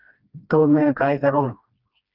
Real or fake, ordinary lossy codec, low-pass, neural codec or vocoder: fake; Opus, 24 kbps; 5.4 kHz; codec, 16 kHz, 1 kbps, FreqCodec, smaller model